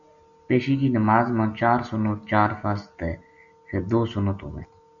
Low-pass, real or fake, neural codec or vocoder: 7.2 kHz; real; none